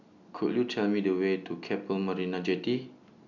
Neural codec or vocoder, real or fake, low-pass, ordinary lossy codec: none; real; 7.2 kHz; none